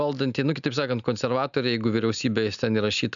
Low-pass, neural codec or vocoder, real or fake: 7.2 kHz; none; real